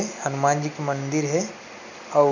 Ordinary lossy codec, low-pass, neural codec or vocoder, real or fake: none; 7.2 kHz; none; real